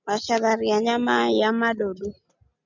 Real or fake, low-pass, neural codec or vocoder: real; 7.2 kHz; none